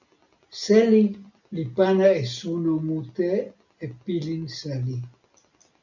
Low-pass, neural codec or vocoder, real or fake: 7.2 kHz; none; real